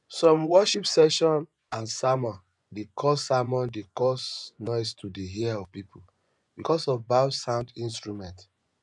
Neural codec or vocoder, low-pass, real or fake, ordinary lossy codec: vocoder, 24 kHz, 100 mel bands, Vocos; 10.8 kHz; fake; none